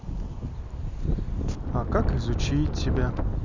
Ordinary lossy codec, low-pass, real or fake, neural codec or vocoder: none; 7.2 kHz; real; none